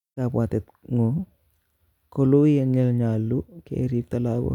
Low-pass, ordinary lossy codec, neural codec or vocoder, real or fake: 19.8 kHz; none; none; real